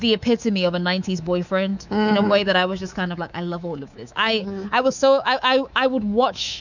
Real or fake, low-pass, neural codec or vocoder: fake; 7.2 kHz; codec, 24 kHz, 3.1 kbps, DualCodec